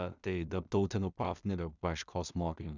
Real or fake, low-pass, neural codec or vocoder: fake; 7.2 kHz; codec, 16 kHz in and 24 kHz out, 0.4 kbps, LongCat-Audio-Codec, two codebook decoder